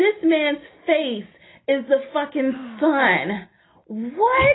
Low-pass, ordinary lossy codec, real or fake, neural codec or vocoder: 7.2 kHz; AAC, 16 kbps; real; none